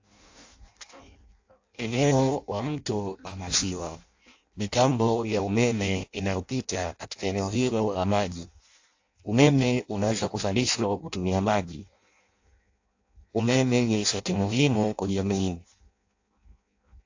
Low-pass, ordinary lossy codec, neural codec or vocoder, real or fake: 7.2 kHz; AAC, 48 kbps; codec, 16 kHz in and 24 kHz out, 0.6 kbps, FireRedTTS-2 codec; fake